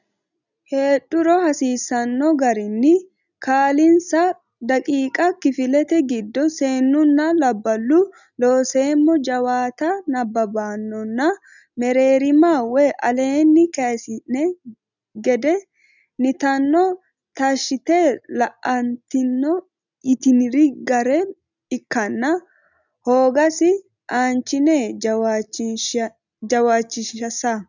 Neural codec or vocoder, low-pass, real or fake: none; 7.2 kHz; real